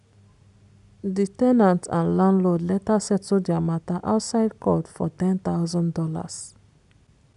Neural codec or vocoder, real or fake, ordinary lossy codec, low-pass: none; real; AAC, 96 kbps; 10.8 kHz